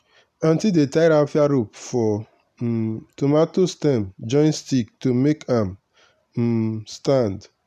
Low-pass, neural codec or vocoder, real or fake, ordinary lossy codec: 14.4 kHz; none; real; none